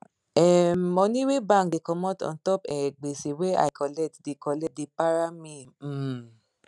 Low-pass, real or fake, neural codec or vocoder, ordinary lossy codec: none; real; none; none